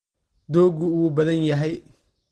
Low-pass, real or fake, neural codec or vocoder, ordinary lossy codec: 10.8 kHz; real; none; Opus, 16 kbps